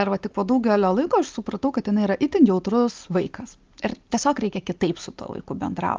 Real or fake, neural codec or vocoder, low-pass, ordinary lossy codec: real; none; 7.2 kHz; Opus, 32 kbps